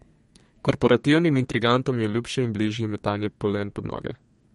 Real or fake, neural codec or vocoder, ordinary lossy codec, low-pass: fake; codec, 32 kHz, 1.9 kbps, SNAC; MP3, 48 kbps; 14.4 kHz